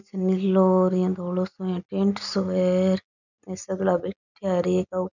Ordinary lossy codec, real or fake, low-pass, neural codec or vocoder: none; real; 7.2 kHz; none